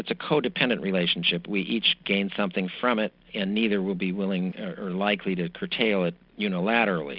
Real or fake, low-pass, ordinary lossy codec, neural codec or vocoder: real; 5.4 kHz; Opus, 64 kbps; none